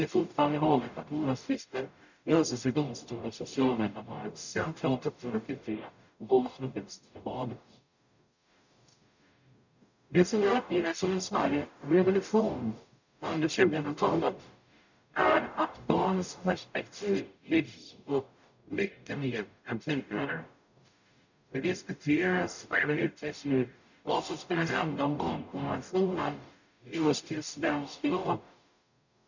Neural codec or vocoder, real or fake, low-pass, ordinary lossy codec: codec, 44.1 kHz, 0.9 kbps, DAC; fake; 7.2 kHz; none